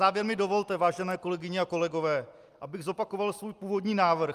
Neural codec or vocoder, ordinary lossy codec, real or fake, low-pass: none; Opus, 32 kbps; real; 14.4 kHz